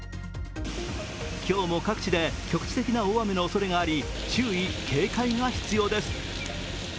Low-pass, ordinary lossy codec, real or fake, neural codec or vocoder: none; none; real; none